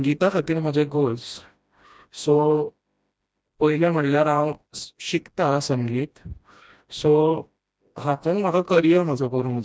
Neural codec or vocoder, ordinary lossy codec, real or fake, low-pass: codec, 16 kHz, 1 kbps, FreqCodec, smaller model; none; fake; none